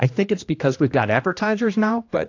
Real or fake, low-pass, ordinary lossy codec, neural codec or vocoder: fake; 7.2 kHz; AAC, 48 kbps; codec, 16 kHz, 1 kbps, X-Codec, HuBERT features, trained on general audio